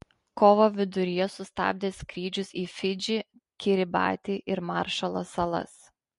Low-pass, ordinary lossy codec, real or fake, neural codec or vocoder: 14.4 kHz; MP3, 48 kbps; real; none